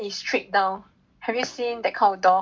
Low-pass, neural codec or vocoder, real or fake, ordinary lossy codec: none; none; real; none